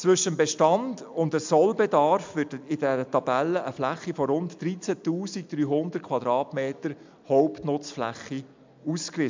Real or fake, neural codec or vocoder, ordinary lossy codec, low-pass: real; none; MP3, 64 kbps; 7.2 kHz